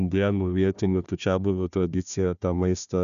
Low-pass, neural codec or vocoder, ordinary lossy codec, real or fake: 7.2 kHz; codec, 16 kHz, 1 kbps, FunCodec, trained on Chinese and English, 50 frames a second; MP3, 96 kbps; fake